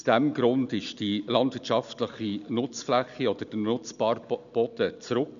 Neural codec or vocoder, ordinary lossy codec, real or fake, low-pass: none; none; real; 7.2 kHz